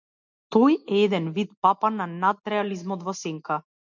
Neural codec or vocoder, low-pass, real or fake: none; 7.2 kHz; real